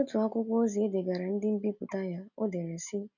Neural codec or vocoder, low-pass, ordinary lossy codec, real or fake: none; 7.2 kHz; none; real